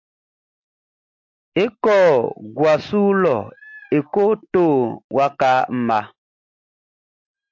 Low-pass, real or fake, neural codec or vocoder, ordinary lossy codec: 7.2 kHz; real; none; MP3, 48 kbps